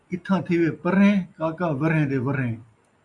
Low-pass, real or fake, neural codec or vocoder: 10.8 kHz; real; none